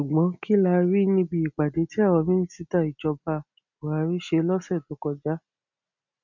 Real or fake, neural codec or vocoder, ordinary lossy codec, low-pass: real; none; none; 7.2 kHz